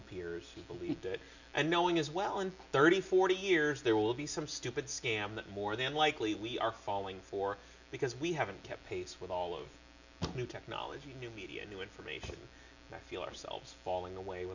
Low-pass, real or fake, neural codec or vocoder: 7.2 kHz; real; none